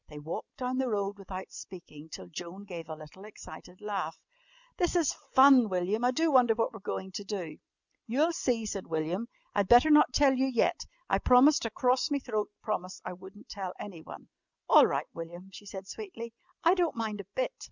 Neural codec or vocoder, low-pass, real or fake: none; 7.2 kHz; real